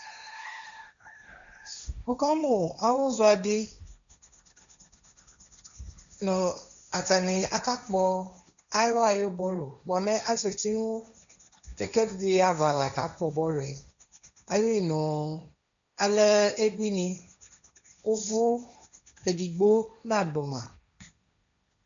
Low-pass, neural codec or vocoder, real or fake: 7.2 kHz; codec, 16 kHz, 1.1 kbps, Voila-Tokenizer; fake